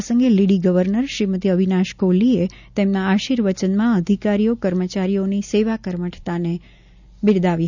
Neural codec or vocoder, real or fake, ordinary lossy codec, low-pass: none; real; none; 7.2 kHz